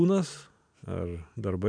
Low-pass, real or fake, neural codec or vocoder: 9.9 kHz; real; none